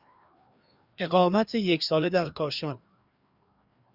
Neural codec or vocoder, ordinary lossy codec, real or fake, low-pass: codec, 16 kHz, 2 kbps, FreqCodec, larger model; Opus, 64 kbps; fake; 5.4 kHz